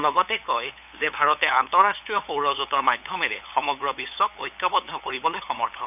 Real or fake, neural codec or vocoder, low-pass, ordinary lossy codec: fake; codec, 16 kHz, 8 kbps, FunCodec, trained on LibriTTS, 25 frames a second; 3.6 kHz; none